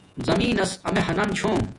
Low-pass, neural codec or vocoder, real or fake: 10.8 kHz; vocoder, 48 kHz, 128 mel bands, Vocos; fake